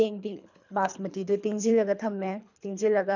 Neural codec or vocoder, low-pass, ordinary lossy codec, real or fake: codec, 24 kHz, 3 kbps, HILCodec; 7.2 kHz; none; fake